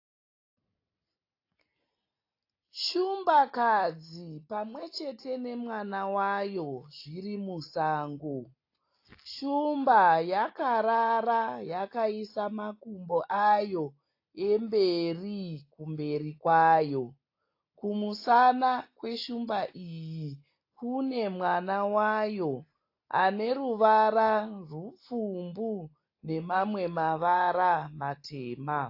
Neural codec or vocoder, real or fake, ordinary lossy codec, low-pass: none; real; AAC, 32 kbps; 5.4 kHz